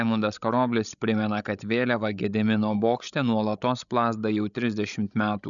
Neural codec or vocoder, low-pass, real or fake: codec, 16 kHz, 16 kbps, FreqCodec, larger model; 7.2 kHz; fake